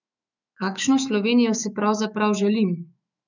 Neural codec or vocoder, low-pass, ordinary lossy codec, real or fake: autoencoder, 48 kHz, 128 numbers a frame, DAC-VAE, trained on Japanese speech; 7.2 kHz; none; fake